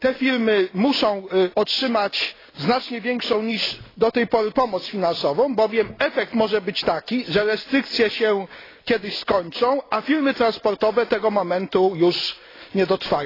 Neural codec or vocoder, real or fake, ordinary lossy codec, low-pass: none; real; AAC, 24 kbps; 5.4 kHz